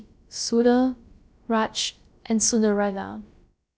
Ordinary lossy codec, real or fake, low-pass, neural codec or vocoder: none; fake; none; codec, 16 kHz, about 1 kbps, DyCAST, with the encoder's durations